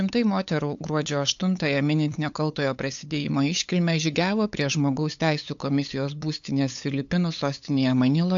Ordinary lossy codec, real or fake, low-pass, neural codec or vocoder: AAC, 64 kbps; fake; 7.2 kHz; codec, 16 kHz, 8 kbps, FunCodec, trained on LibriTTS, 25 frames a second